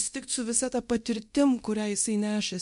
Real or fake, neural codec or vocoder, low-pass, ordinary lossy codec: fake; codec, 24 kHz, 0.9 kbps, DualCodec; 10.8 kHz; MP3, 64 kbps